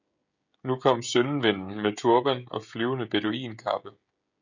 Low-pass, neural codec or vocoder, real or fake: 7.2 kHz; codec, 16 kHz, 16 kbps, FreqCodec, smaller model; fake